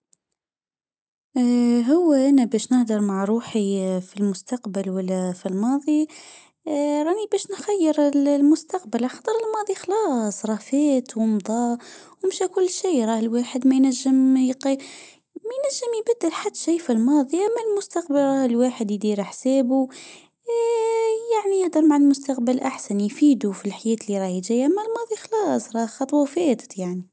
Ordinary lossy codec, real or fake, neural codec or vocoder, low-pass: none; real; none; none